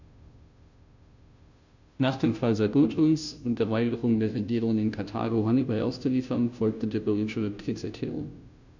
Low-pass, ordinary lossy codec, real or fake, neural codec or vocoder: 7.2 kHz; none; fake; codec, 16 kHz, 0.5 kbps, FunCodec, trained on Chinese and English, 25 frames a second